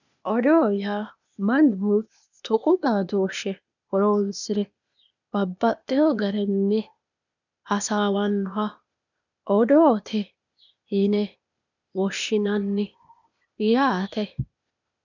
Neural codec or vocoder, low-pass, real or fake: codec, 16 kHz, 0.8 kbps, ZipCodec; 7.2 kHz; fake